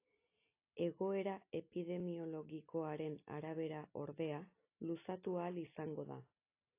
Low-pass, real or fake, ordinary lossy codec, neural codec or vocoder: 3.6 kHz; real; AAC, 24 kbps; none